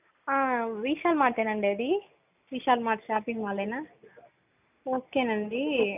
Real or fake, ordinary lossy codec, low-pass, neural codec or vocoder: real; none; 3.6 kHz; none